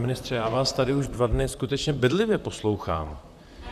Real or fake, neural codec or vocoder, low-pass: fake; vocoder, 44.1 kHz, 128 mel bands, Pupu-Vocoder; 14.4 kHz